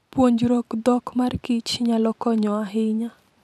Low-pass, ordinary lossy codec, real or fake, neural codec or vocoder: 14.4 kHz; none; fake; vocoder, 44.1 kHz, 128 mel bands every 512 samples, BigVGAN v2